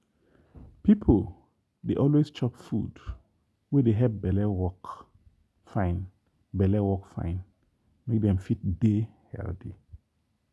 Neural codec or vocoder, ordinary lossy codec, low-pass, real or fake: none; none; none; real